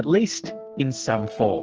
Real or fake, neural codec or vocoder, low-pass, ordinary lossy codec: fake; codec, 44.1 kHz, 2.6 kbps, SNAC; 7.2 kHz; Opus, 16 kbps